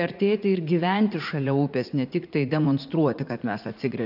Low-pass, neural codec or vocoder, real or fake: 5.4 kHz; vocoder, 44.1 kHz, 80 mel bands, Vocos; fake